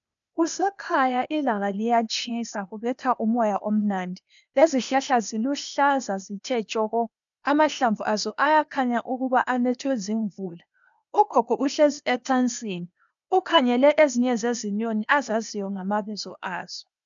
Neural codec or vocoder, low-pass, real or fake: codec, 16 kHz, 0.8 kbps, ZipCodec; 7.2 kHz; fake